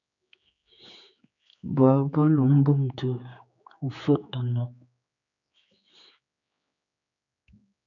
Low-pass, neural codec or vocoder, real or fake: 7.2 kHz; codec, 16 kHz, 4 kbps, X-Codec, HuBERT features, trained on general audio; fake